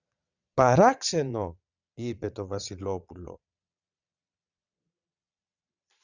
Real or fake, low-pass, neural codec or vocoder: fake; 7.2 kHz; vocoder, 22.05 kHz, 80 mel bands, Vocos